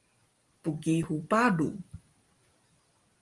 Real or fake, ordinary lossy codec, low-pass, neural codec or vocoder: real; Opus, 24 kbps; 10.8 kHz; none